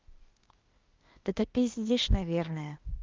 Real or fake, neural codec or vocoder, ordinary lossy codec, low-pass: fake; codec, 16 kHz, 0.8 kbps, ZipCodec; Opus, 32 kbps; 7.2 kHz